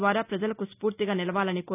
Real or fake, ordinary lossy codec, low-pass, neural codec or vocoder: real; none; 3.6 kHz; none